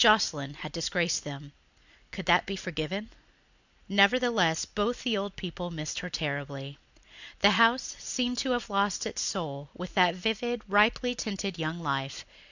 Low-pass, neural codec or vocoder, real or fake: 7.2 kHz; none; real